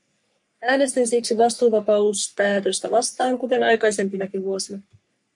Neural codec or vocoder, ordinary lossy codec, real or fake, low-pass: codec, 44.1 kHz, 3.4 kbps, Pupu-Codec; MP3, 64 kbps; fake; 10.8 kHz